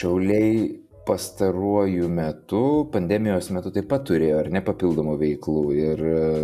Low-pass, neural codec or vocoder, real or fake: 14.4 kHz; none; real